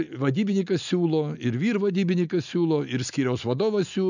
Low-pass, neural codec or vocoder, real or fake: 7.2 kHz; none; real